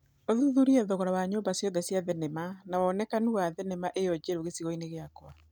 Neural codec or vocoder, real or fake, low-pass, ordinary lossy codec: none; real; none; none